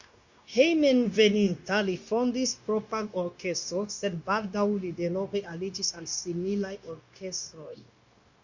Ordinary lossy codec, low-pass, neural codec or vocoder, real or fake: Opus, 64 kbps; 7.2 kHz; codec, 16 kHz, 0.9 kbps, LongCat-Audio-Codec; fake